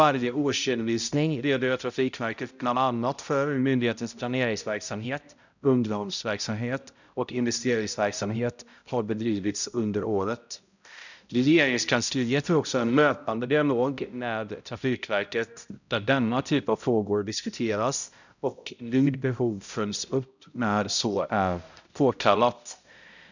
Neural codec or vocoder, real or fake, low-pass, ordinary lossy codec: codec, 16 kHz, 0.5 kbps, X-Codec, HuBERT features, trained on balanced general audio; fake; 7.2 kHz; none